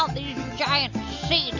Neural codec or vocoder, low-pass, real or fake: none; 7.2 kHz; real